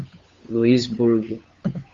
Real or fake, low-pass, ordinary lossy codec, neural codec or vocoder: fake; 7.2 kHz; Opus, 32 kbps; codec, 16 kHz, 8 kbps, FunCodec, trained on Chinese and English, 25 frames a second